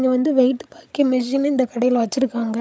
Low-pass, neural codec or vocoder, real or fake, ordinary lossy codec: none; codec, 16 kHz, 16 kbps, FreqCodec, larger model; fake; none